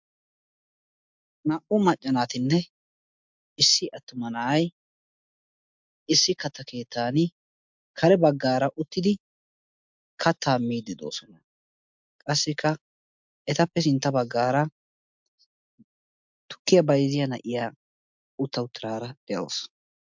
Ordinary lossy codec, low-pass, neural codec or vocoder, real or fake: MP3, 64 kbps; 7.2 kHz; none; real